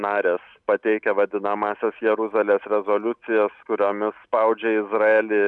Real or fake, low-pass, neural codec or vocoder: real; 10.8 kHz; none